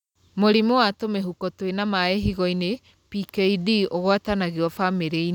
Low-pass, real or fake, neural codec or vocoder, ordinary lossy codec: 19.8 kHz; real; none; none